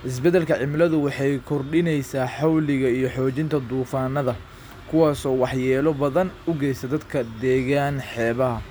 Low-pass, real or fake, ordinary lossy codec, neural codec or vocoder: none; real; none; none